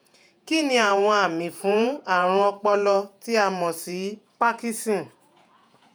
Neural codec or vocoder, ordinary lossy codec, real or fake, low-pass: vocoder, 48 kHz, 128 mel bands, Vocos; none; fake; none